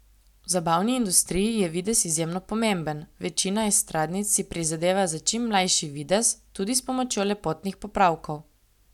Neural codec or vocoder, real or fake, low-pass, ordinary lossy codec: none; real; 19.8 kHz; none